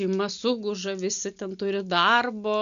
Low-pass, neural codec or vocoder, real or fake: 7.2 kHz; none; real